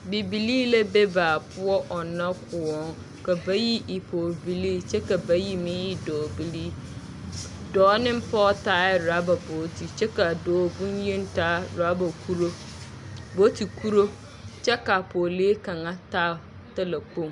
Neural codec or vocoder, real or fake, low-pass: none; real; 10.8 kHz